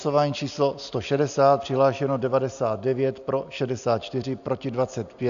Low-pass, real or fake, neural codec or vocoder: 7.2 kHz; real; none